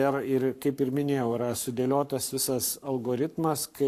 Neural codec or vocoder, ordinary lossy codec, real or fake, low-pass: codec, 44.1 kHz, 7.8 kbps, Pupu-Codec; AAC, 64 kbps; fake; 14.4 kHz